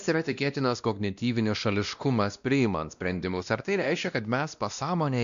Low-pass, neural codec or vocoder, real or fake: 7.2 kHz; codec, 16 kHz, 1 kbps, X-Codec, WavLM features, trained on Multilingual LibriSpeech; fake